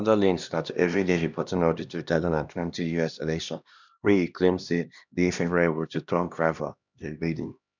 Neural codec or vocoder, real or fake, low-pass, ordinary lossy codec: codec, 16 kHz, 1 kbps, X-Codec, HuBERT features, trained on LibriSpeech; fake; 7.2 kHz; none